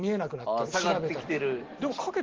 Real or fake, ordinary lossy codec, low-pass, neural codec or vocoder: real; Opus, 16 kbps; 7.2 kHz; none